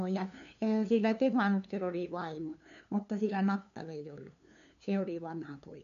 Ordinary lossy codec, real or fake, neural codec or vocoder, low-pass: none; fake; codec, 16 kHz, 2 kbps, FunCodec, trained on LibriTTS, 25 frames a second; 7.2 kHz